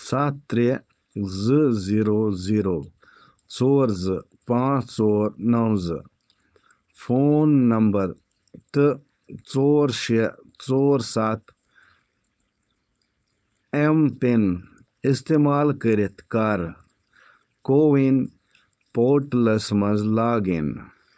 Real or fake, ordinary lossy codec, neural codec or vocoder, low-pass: fake; none; codec, 16 kHz, 4.8 kbps, FACodec; none